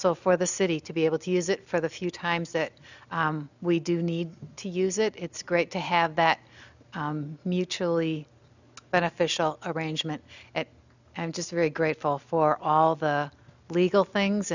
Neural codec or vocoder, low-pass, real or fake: none; 7.2 kHz; real